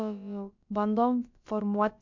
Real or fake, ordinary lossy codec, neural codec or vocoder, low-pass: fake; MP3, 64 kbps; codec, 16 kHz, about 1 kbps, DyCAST, with the encoder's durations; 7.2 kHz